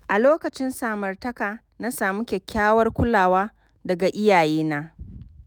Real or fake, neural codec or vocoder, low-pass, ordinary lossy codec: fake; autoencoder, 48 kHz, 128 numbers a frame, DAC-VAE, trained on Japanese speech; none; none